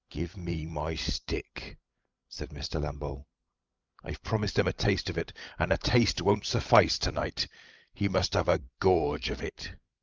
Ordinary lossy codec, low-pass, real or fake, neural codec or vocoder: Opus, 24 kbps; 7.2 kHz; fake; codec, 16 kHz, 16 kbps, FreqCodec, larger model